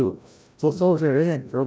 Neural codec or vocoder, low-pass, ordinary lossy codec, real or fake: codec, 16 kHz, 0.5 kbps, FreqCodec, larger model; none; none; fake